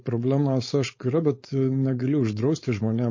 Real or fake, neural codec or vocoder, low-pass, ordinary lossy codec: fake; codec, 16 kHz, 4.8 kbps, FACodec; 7.2 kHz; MP3, 32 kbps